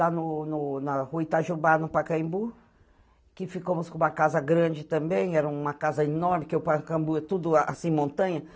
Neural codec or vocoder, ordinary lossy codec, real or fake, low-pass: none; none; real; none